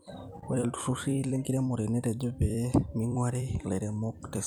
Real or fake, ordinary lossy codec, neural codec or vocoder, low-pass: fake; none; vocoder, 44.1 kHz, 128 mel bands every 256 samples, BigVGAN v2; 19.8 kHz